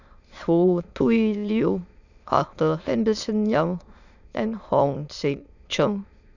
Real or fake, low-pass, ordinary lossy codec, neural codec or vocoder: fake; 7.2 kHz; none; autoencoder, 22.05 kHz, a latent of 192 numbers a frame, VITS, trained on many speakers